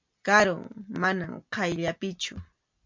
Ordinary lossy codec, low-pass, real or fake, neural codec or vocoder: MP3, 64 kbps; 7.2 kHz; real; none